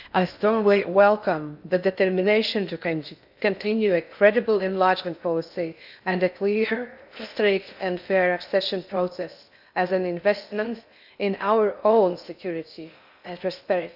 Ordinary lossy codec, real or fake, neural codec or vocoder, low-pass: none; fake; codec, 16 kHz in and 24 kHz out, 0.6 kbps, FocalCodec, streaming, 2048 codes; 5.4 kHz